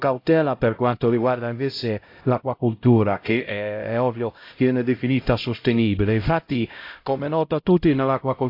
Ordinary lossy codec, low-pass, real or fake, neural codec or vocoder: AAC, 32 kbps; 5.4 kHz; fake; codec, 16 kHz, 0.5 kbps, X-Codec, HuBERT features, trained on LibriSpeech